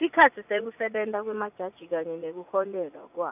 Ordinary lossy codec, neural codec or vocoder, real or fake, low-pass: none; vocoder, 44.1 kHz, 80 mel bands, Vocos; fake; 3.6 kHz